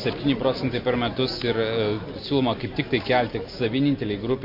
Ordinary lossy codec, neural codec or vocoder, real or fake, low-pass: MP3, 32 kbps; none; real; 5.4 kHz